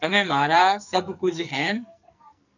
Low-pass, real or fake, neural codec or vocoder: 7.2 kHz; fake; codec, 44.1 kHz, 2.6 kbps, SNAC